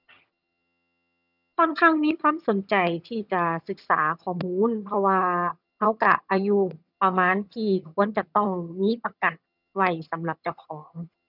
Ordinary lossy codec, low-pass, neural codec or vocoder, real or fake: none; 5.4 kHz; vocoder, 22.05 kHz, 80 mel bands, HiFi-GAN; fake